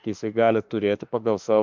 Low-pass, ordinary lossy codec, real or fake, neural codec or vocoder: 7.2 kHz; MP3, 64 kbps; fake; autoencoder, 48 kHz, 32 numbers a frame, DAC-VAE, trained on Japanese speech